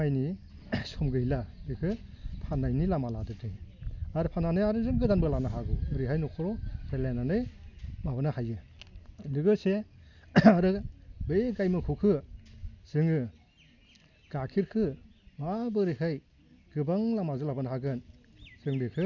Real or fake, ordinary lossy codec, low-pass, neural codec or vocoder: real; none; 7.2 kHz; none